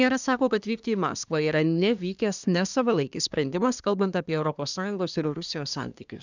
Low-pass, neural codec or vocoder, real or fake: 7.2 kHz; codec, 24 kHz, 1 kbps, SNAC; fake